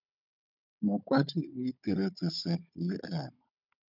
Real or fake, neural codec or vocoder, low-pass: fake; codec, 16 kHz, 16 kbps, FunCodec, trained on Chinese and English, 50 frames a second; 5.4 kHz